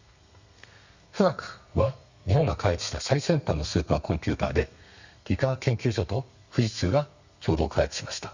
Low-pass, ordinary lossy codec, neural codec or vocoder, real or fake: 7.2 kHz; Opus, 64 kbps; codec, 32 kHz, 1.9 kbps, SNAC; fake